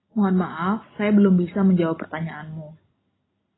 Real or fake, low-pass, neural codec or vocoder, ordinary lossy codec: real; 7.2 kHz; none; AAC, 16 kbps